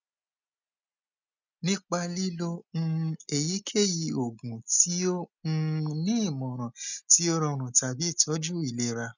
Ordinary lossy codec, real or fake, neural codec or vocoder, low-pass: none; real; none; 7.2 kHz